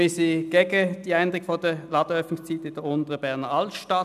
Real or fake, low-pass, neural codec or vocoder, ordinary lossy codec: real; 14.4 kHz; none; none